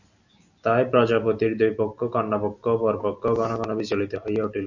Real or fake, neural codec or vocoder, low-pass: real; none; 7.2 kHz